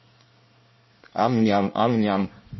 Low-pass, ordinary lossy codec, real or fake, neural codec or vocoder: 7.2 kHz; MP3, 24 kbps; fake; codec, 24 kHz, 1 kbps, SNAC